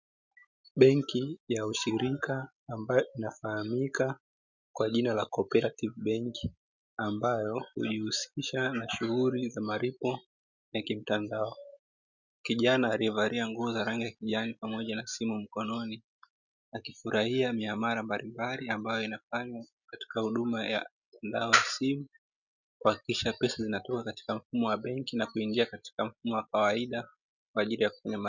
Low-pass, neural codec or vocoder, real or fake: 7.2 kHz; none; real